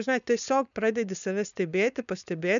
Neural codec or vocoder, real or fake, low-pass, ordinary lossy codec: codec, 16 kHz, 4.8 kbps, FACodec; fake; 7.2 kHz; MP3, 96 kbps